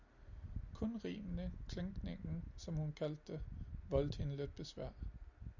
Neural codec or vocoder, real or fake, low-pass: none; real; 7.2 kHz